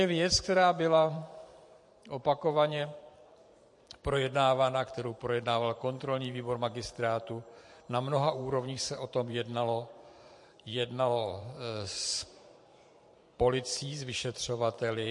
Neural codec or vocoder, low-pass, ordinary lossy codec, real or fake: vocoder, 44.1 kHz, 128 mel bands every 512 samples, BigVGAN v2; 10.8 kHz; MP3, 48 kbps; fake